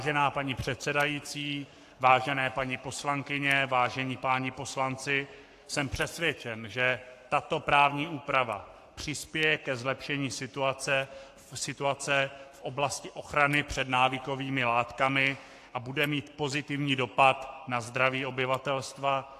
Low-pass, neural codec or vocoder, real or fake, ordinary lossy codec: 14.4 kHz; codec, 44.1 kHz, 7.8 kbps, Pupu-Codec; fake; AAC, 64 kbps